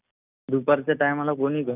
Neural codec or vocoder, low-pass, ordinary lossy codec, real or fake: none; 3.6 kHz; none; real